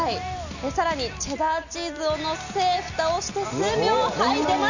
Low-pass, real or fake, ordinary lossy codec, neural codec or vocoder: 7.2 kHz; real; none; none